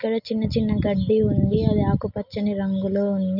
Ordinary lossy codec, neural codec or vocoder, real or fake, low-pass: none; none; real; 5.4 kHz